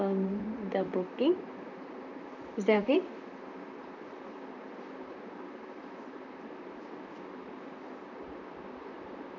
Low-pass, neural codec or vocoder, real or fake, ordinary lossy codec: 7.2 kHz; vocoder, 44.1 kHz, 128 mel bands, Pupu-Vocoder; fake; none